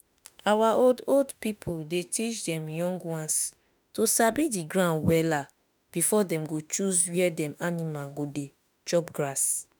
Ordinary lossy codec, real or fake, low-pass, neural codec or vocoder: none; fake; none; autoencoder, 48 kHz, 32 numbers a frame, DAC-VAE, trained on Japanese speech